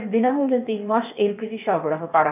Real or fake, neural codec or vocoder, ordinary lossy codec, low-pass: fake; codec, 16 kHz, 0.8 kbps, ZipCodec; none; 3.6 kHz